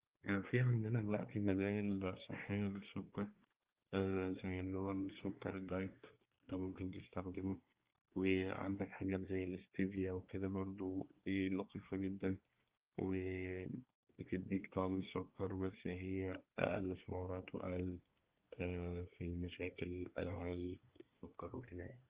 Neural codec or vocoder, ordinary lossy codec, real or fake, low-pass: codec, 24 kHz, 1 kbps, SNAC; Opus, 32 kbps; fake; 3.6 kHz